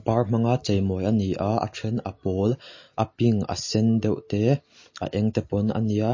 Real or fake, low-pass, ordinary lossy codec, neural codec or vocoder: real; 7.2 kHz; MP3, 32 kbps; none